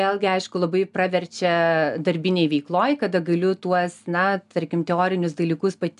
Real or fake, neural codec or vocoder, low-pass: real; none; 10.8 kHz